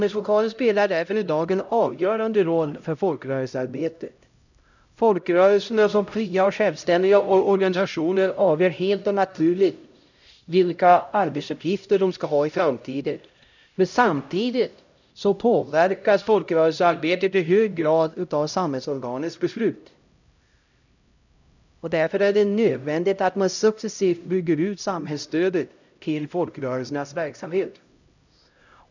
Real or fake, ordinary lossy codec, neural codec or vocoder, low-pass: fake; none; codec, 16 kHz, 0.5 kbps, X-Codec, HuBERT features, trained on LibriSpeech; 7.2 kHz